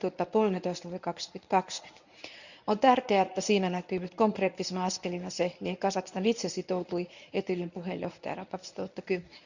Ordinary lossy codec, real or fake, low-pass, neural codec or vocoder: none; fake; 7.2 kHz; codec, 24 kHz, 0.9 kbps, WavTokenizer, medium speech release version 1